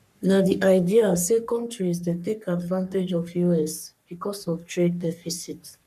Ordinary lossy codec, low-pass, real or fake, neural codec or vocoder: none; 14.4 kHz; fake; codec, 44.1 kHz, 3.4 kbps, Pupu-Codec